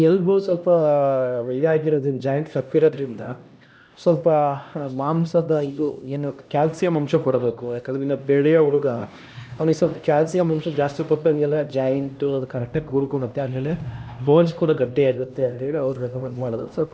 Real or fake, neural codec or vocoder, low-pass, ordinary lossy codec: fake; codec, 16 kHz, 1 kbps, X-Codec, HuBERT features, trained on LibriSpeech; none; none